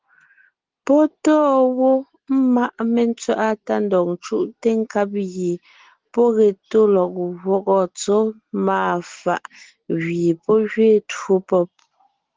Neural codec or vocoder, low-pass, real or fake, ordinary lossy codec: none; 7.2 kHz; real; Opus, 16 kbps